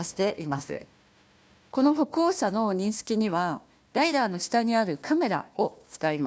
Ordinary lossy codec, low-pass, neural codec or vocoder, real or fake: none; none; codec, 16 kHz, 1 kbps, FunCodec, trained on Chinese and English, 50 frames a second; fake